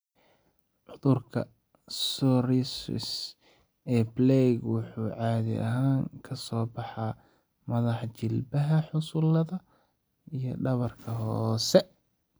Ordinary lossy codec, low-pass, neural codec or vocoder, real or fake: none; none; none; real